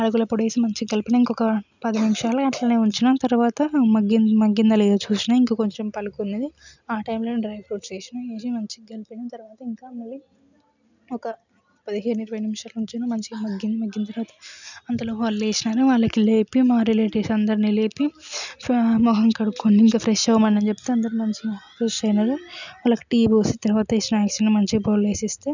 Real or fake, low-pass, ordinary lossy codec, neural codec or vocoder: real; 7.2 kHz; none; none